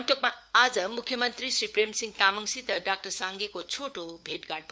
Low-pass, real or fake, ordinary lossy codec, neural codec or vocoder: none; fake; none; codec, 16 kHz, 4 kbps, FunCodec, trained on LibriTTS, 50 frames a second